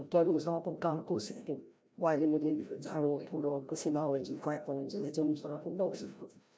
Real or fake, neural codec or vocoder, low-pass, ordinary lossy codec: fake; codec, 16 kHz, 0.5 kbps, FreqCodec, larger model; none; none